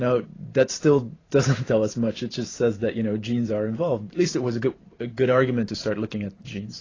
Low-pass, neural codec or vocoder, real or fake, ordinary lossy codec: 7.2 kHz; vocoder, 44.1 kHz, 128 mel bands every 512 samples, BigVGAN v2; fake; AAC, 32 kbps